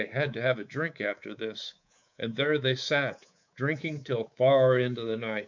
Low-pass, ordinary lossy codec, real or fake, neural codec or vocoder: 7.2 kHz; MP3, 64 kbps; fake; codec, 24 kHz, 3.1 kbps, DualCodec